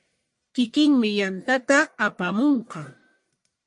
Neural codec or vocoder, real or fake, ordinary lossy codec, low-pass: codec, 44.1 kHz, 1.7 kbps, Pupu-Codec; fake; MP3, 48 kbps; 10.8 kHz